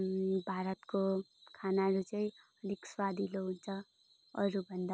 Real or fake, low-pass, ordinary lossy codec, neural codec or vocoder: real; none; none; none